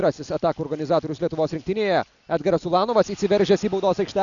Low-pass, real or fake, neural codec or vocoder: 7.2 kHz; real; none